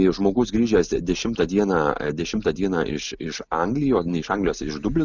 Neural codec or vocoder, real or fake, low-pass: none; real; 7.2 kHz